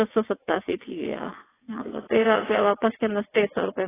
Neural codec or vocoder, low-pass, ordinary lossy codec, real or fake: vocoder, 22.05 kHz, 80 mel bands, WaveNeXt; 3.6 kHz; AAC, 16 kbps; fake